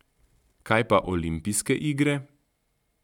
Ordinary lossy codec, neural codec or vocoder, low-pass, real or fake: none; none; 19.8 kHz; real